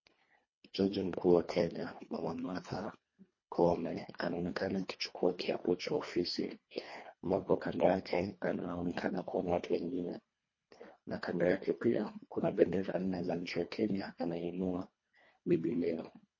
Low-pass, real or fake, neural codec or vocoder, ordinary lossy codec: 7.2 kHz; fake; codec, 24 kHz, 1.5 kbps, HILCodec; MP3, 32 kbps